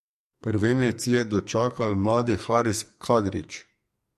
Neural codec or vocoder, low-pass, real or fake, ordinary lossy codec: codec, 44.1 kHz, 2.6 kbps, SNAC; 14.4 kHz; fake; MP3, 64 kbps